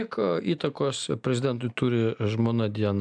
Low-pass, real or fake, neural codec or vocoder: 9.9 kHz; real; none